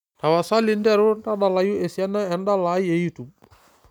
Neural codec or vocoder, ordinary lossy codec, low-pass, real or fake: none; none; 19.8 kHz; real